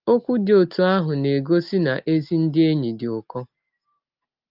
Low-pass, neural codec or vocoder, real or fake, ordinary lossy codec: 5.4 kHz; none; real; Opus, 32 kbps